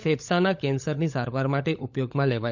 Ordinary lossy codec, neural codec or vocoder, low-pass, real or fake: none; codec, 16 kHz, 16 kbps, FunCodec, trained on LibriTTS, 50 frames a second; 7.2 kHz; fake